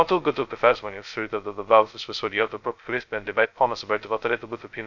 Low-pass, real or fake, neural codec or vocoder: 7.2 kHz; fake; codec, 16 kHz, 0.2 kbps, FocalCodec